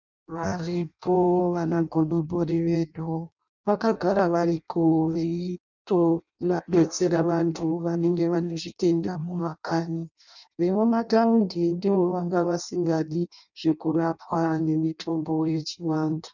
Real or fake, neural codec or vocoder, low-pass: fake; codec, 16 kHz in and 24 kHz out, 0.6 kbps, FireRedTTS-2 codec; 7.2 kHz